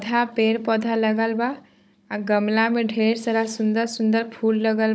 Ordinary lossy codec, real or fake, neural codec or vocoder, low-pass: none; fake; codec, 16 kHz, 16 kbps, FunCodec, trained on Chinese and English, 50 frames a second; none